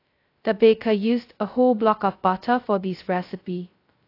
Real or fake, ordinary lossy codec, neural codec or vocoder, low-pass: fake; AAC, 32 kbps; codec, 16 kHz, 0.2 kbps, FocalCodec; 5.4 kHz